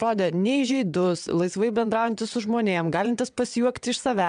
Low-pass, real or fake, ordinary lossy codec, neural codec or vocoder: 9.9 kHz; fake; AAC, 96 kbps; vocoder, 22.05 kHz, 80 mel bands, WaveNeXt